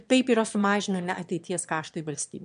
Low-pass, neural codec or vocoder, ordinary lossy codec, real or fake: 9.9 kHz; autoencoder, 22.05 kHz, a latent of 192 numbers a frame, VITS, trained on one speaker; MP3, 64 kbps; fake